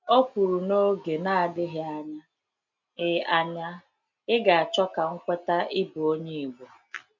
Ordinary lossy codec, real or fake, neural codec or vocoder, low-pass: MP3, 64 kbps; real; none; 7.2 kHz